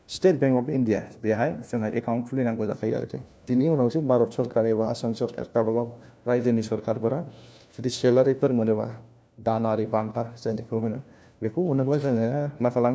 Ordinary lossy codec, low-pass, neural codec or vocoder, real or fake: none; none; codec, 16 kHz, 1 kbps, FunCodec, trained on LibriTTS, 50 frames a second; fake